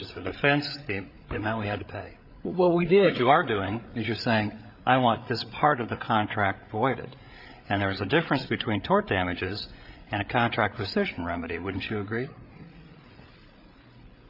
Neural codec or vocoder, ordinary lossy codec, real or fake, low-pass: codec, 16 kHz, 8 kbps, FreqCodec, larger model; AAC, 48 kbps; fake; 5.4 kHz